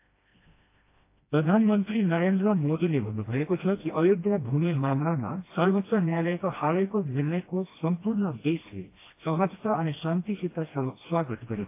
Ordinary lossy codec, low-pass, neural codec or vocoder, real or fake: AAC, 24 kbps; 3.6 kHz; codec, 16 kHz, 1 kbps, FreqCodec, smaller model; fake